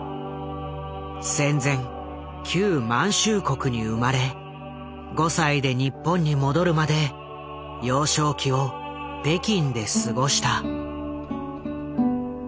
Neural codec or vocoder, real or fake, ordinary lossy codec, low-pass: none; real; none; none